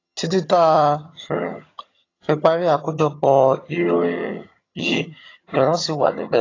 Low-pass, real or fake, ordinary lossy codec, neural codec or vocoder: 7.2 kHz; fake; AAC, 32 kbps; vocoder, 22.05 kHz, 80 mel bands, HiFi-GAN